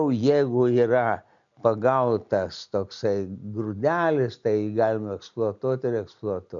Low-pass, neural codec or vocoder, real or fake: 7.2 kHz; none; real